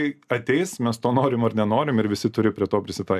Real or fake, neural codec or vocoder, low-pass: real; none; 14.4 kHz